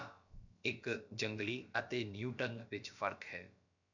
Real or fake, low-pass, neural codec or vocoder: fake; 7.2 kHz; codec, 16 kHz, about 1 kbps, DyCAST, with the encoder's durations